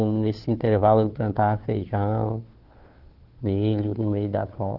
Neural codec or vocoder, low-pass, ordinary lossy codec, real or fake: codec, 16 kHz, 2 kbps, FunCodec, trained on Chinese and English, 25 frames a second; 5.4 kHz; Opus, 16 kbps; fake